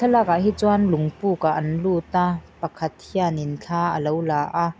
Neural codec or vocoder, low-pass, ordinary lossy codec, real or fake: none; none; none; real